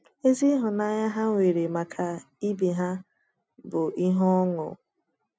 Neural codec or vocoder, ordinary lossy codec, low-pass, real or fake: none; none; none; real